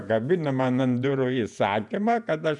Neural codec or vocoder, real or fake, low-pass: vocoder, 48 kHz, 128 mel bands, Vocos; fake; 10.8 kHz